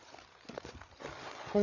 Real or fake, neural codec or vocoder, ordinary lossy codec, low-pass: fake; codec, 16 kHz, 8 kbps, FreqCodec, larger model; none; 7.2 kHz